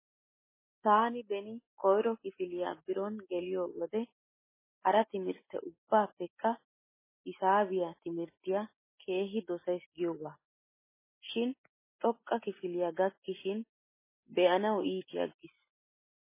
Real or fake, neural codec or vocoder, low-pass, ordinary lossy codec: real; none; 3.6 kHz; MP3, 16 kbps